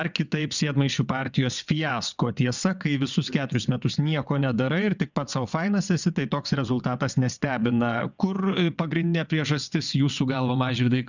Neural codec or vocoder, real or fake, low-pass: vocoder, 22.05 kHz, 80 mel bands, WaveNeXt; fake; 7.2 kHz